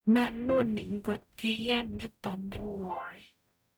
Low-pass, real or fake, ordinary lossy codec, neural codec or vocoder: none; fake; none; codec, 44.1 kHz, 0.9 kbps, DAC